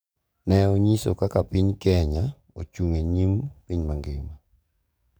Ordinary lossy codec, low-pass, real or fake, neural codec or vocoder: none; none; fake; codec, 44.1 kHz, 7.8 kbps, DAC